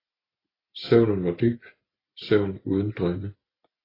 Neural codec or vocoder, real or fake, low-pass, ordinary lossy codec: none; real; 5.4 kHz; AAC, 24 kbps